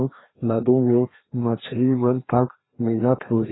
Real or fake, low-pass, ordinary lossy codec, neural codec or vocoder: fake; 7.2 kHz; AAC, 16 kbps; codec, 16 kHz, 1 kbps, FreqCodec, larger model